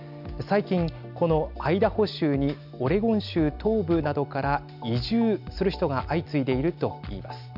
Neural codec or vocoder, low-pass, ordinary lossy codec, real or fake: none; 5.4 kHz; none; real